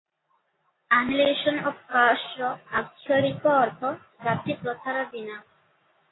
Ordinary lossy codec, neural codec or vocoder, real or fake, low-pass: AAC, 16 kbps; none; real; 7.2 kHz